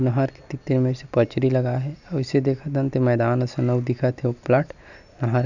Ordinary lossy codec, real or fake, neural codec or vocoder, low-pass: none; real; none; 7.2 kHz